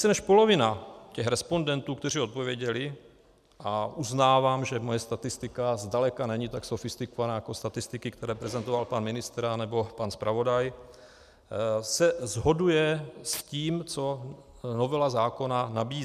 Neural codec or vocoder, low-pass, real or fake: none; 14.4 kHz; real